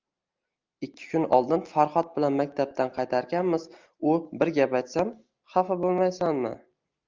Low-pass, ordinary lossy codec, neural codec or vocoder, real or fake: 7.2 kHz; Opus, 24 kbps; none; real